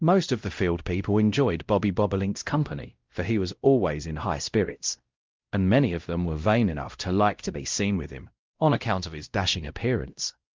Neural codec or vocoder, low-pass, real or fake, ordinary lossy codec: codec, 16 kHz, 0.5 kbps, X-Codec, WavLM features, trained on Multilingual LibriSpeech; 7.2 kHz; fake; Opus, 24 kbps